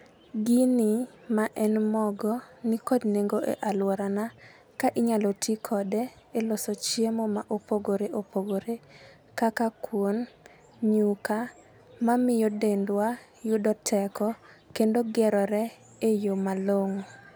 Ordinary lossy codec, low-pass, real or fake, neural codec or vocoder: none; none; real; none